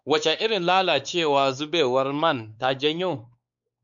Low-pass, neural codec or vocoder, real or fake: 7.2 kHz; codec, 16 kHz, 4 kbps, X-Codec, WavLM features, trained on Multilingual LibriSpeech; fake